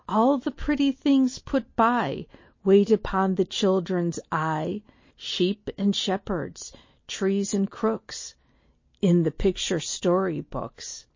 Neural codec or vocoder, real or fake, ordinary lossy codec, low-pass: none; real; MP3, 32 kbps; 7.2 kHz